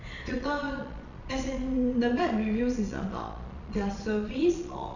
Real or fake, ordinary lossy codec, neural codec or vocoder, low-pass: fake; none; vocoder, 22.05 kHz, 80 mel bands, Vocos; 7.2 kHz